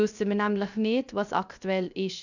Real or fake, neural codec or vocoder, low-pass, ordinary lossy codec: fake; codec, 16 kHz, 0.3 kbps, FocalCodec; 7.2 kHz; none